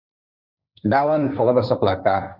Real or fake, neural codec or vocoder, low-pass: fake; codec, 16 kHz, 1.1 kbps, Voila-Tokenizer; 5.4 kHz